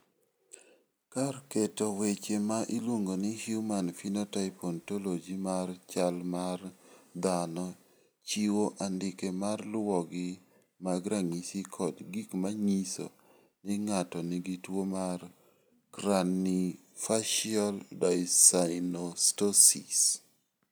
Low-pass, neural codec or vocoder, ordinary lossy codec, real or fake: none; none; none; real